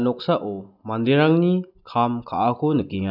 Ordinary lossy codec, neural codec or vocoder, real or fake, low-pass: none; none; real; 5.4 kHz